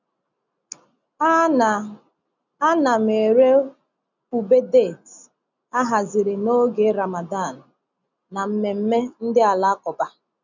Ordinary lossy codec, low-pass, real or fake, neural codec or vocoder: none; 7.2 kHz; real; none